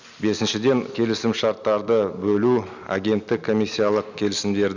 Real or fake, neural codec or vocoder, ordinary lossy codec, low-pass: real; none; none; 7.2 kHz